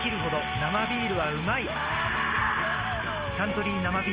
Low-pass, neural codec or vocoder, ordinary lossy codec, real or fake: 3.6 kHz; none; Opus, 32 kbps; real